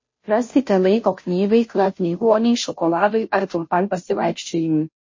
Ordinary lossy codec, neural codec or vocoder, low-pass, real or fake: MP3, 32 kbps; codec, 16 kHz, 0.5 kbps, FunCodec, trained on Chinese and English, 25 frames a second; 7.2 kHz; fake